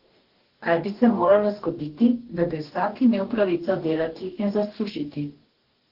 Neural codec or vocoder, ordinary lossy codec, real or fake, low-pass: codec, 44.1 kHz, 2.6 kbps, DAC; Opus, 16 kbps; fake; 5.4 kHz